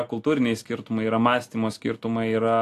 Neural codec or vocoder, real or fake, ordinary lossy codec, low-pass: none; real; AAC, 64 kbps; 14.4 kHz